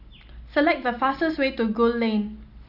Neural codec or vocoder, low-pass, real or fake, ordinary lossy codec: none; 5.4 kHz; real; none